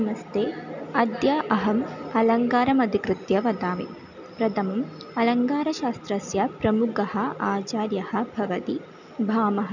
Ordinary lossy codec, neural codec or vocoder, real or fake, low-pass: none; none; real; 7.2 kHz